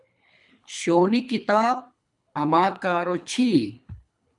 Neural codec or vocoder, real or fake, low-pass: codec, 24 kHz, 3 kbps, HILCodec; fake; 10.8 kHz